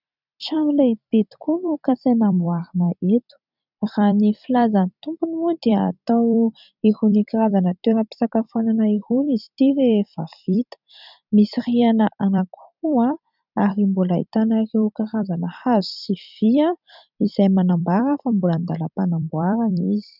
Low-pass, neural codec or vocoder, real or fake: 5.4 kHz; vocoder, 44.1 kHz, 128 mel bands every 256 samples, BigVGAN v2; fake